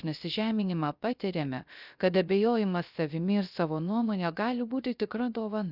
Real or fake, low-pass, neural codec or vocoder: fake; 5.4 kHz; codec, 16 kHz, 0.3 kbps, FocalCodec